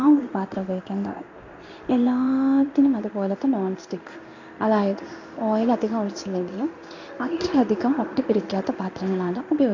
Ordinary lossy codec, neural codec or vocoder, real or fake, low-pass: AAC, 48 kbps; codec, 16 kHz in and 24 kHz out, 1 kbps, XY-Tokenizer; fake; 7.2 kHz